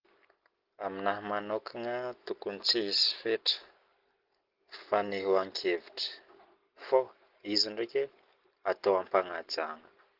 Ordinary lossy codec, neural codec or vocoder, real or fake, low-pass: Opus, 16 kbps; none; real; 5.4 kHz